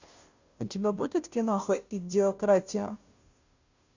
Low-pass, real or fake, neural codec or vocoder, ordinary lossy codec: 7.2 kHz; fake; codec, 16 kHz, 0.5 kbps, FunCodec, trained on Chinese and English, 25 frames a second; Opus, 64 kbps